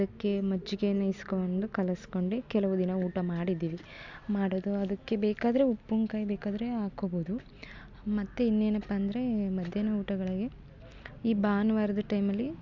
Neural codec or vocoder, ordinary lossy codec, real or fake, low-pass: none; MP3, 64 kbps; real; 7.2 kHz